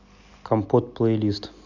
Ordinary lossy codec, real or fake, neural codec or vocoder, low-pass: none; real; none; 7.2 kHz